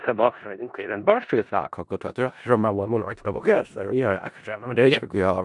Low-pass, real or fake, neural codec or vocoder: 10.8 kHz; fake; codec, 16 kHz in and 24 kHz out, 0.4 kbps, LongCat-Audio-Codec, four codebook decoder